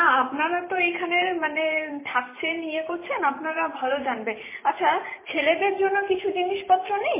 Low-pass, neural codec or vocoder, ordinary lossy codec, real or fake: 3.6 kHz; vocoder, 44.1 kHz, 128 mel bands every 512 samples, BigVGAN v2; MP3, 16 kbps; fake